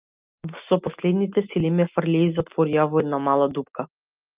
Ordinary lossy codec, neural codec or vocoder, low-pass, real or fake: Opus, 24 kbps; none; 3.6 kHz; real